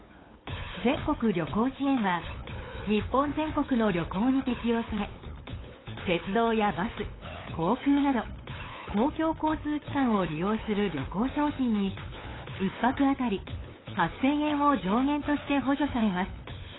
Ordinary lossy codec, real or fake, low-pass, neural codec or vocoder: AAC, 16 kbps; fake; 7.2 kHz; codec, 16 kHz, 8 kbps, FunCodec, trained on LibriTTS, 25 frames a second